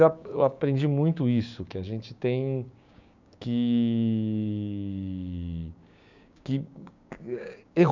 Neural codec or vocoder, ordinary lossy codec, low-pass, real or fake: codec, 16 kHz, 6 kbps, DAC; none; 7.2 kHz; fake